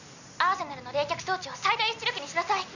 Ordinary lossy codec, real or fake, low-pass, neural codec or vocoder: none; real; 7.2 kHz; none